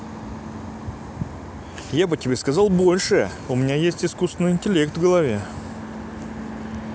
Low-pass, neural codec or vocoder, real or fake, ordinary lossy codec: none; none; real; none